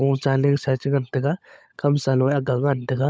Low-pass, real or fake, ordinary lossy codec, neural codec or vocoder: none; fake; none; codec, 16 kHz, 16 kbps, FunCodec, trained on LibriTTS, 50 frames a second